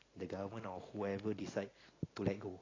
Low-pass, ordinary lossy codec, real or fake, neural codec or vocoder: 7.2 kHz; AAC, 32 kbps; real; none